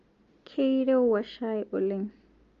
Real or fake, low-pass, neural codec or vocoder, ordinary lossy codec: real; 7.2 kHz; none; Opus, 32 kbps